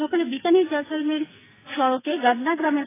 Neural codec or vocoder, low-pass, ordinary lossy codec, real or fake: codec, 44.1 kHz, 2.6 kbps, SNAC; 3.6 kHz; AAC, 16 kbps; fake